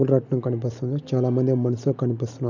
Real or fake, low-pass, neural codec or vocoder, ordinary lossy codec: real; 7.2 kHz; none; none